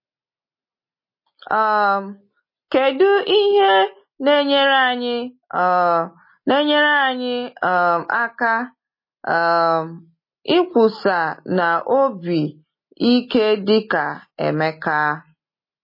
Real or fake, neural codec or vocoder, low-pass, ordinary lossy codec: real; none; 5.4 kHz; MP3, 24 kbps